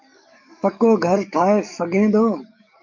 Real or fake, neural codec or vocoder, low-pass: fake; codec, 44.1 kHz, 7.8 kbps, DAC; 7.2 kHz